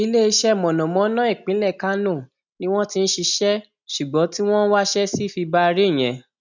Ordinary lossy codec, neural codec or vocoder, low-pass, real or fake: none; none; 7.2 kHz; real